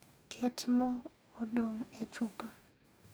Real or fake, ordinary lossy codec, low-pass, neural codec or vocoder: fake; none; none; codec, 44.1 kHz, 2.6 kbps, DAC